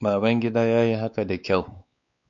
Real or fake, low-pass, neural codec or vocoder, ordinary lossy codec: fake; 7.2 kHz; codec, 16 kHz, 4 kbps, X-Codec, WavLM features, trained on Multilingual LibriSpeech; MP3, 64 kbps